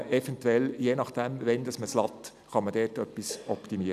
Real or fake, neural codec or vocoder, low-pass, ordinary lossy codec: real; none; 14.4 kHz; none